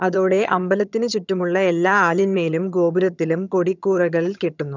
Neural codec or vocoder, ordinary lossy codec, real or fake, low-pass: vocoder, 22.05 kHz, 80 mel bands, HiFi-GAN; none; fake; 7.2 kHz